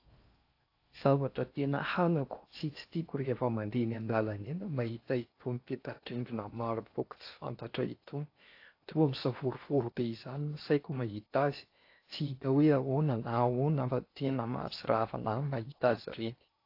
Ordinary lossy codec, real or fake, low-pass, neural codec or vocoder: AAC, 32 kbps; fake; 5.4 kHz; codec, 16 kHz in and 24 kHz out, 0.6 kbps, FocalCodec, streaming, 4096 codes